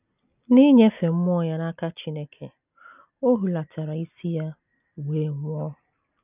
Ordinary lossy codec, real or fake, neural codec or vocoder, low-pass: none; real; none; 3.6 kHz